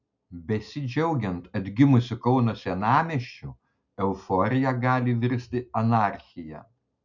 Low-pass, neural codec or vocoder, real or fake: 7.2 kHz; none; real